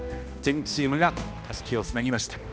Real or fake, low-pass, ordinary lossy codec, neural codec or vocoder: fake; none; none; codec, 16 kHz, 1 kbps, X-Codec, HuBERT features, trained on balanced general audio